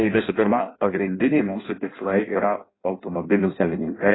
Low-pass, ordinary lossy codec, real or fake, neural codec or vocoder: 7.2 kHz; AAC, 16 kbps; fake; codec, 16 kHz in and 24 kHz out, 0.6 kbps, FireRedTTS-2 codec